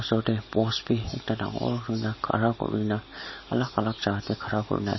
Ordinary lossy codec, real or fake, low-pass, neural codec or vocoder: MP3, 24 kbps; real; 7.2 kHz; none